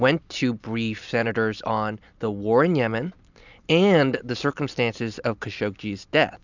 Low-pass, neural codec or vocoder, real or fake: 7.2 kHz; none; real